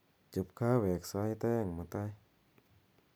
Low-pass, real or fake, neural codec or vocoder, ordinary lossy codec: none; real; none; none